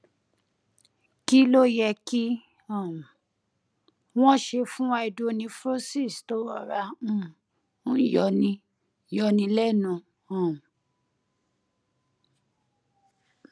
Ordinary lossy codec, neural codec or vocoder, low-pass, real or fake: none; none; none; real